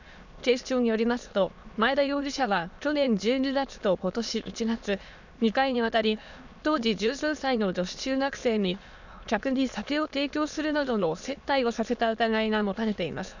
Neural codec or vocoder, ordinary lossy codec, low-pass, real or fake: autoencoder, 22.05 kHz, a latent of 192 numbers a frame, VITS, trained on many speakers; none; 7.2 kHz; fake